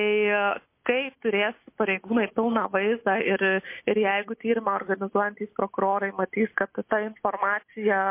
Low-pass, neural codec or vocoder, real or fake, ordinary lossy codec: 3.6 kHz; none; real; MP3, 24 kbps